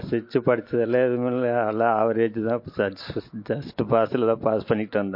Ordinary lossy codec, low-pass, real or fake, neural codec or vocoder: MP3, 32 kbps; 5.4 kHz; real; none